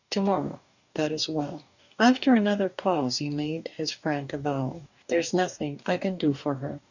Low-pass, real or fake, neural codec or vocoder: 7.2 kHz; fake; codec, 44.1 kHz, 2.6 kbps, DAC